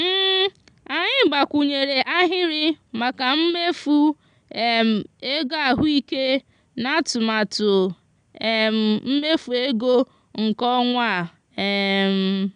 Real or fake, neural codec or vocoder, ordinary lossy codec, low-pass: real; none; none; 9.9 kHz